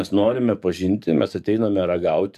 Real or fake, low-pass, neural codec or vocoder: fake; 14.4 kHz; codec, 44.1 kHz, 7.8 kbps, DAC